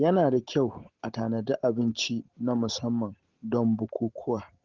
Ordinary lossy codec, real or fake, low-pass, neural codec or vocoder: Opus, 16 kbps; real; 7.2 kHz; none